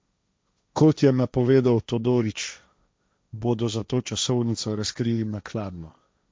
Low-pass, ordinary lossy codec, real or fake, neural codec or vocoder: none; none; fake; codec, 16 kHz, 1.1 kbps, Voila-Tokenizer